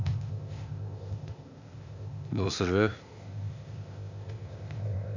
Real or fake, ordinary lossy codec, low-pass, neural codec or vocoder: fake; none; 7.2 kHz; codec, 16 kHz, 0.8 kbps, ZipCodec